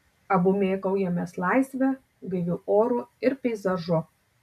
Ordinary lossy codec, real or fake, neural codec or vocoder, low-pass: MP3, 96 kbps; real; none; 14.4 kHz